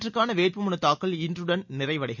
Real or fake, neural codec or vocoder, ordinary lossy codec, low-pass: real; none; none; 7.2 kHz